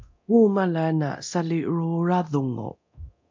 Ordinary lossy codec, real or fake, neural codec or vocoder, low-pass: MP3, 64 kbps; fake; codec, 24 kHz, 0.9 kbps, DualCodec; 7.2 kHz